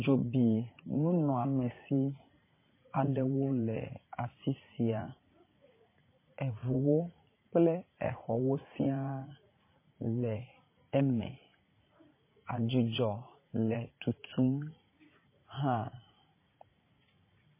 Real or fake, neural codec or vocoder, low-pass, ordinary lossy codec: fake; vocoder, 44.1 kHz, 80 mel bands, Vocos; 3.6 kHz; MP3, 16 kbps